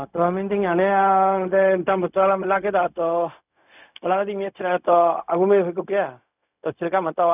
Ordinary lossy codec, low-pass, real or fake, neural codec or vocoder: none; 3.6 kHz; fake; codec, 16 kHz, 0.4 kbps, LongCat-Audio-Codec